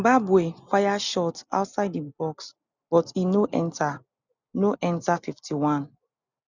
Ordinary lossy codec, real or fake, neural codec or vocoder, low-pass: none; real; none; 7.2 kHz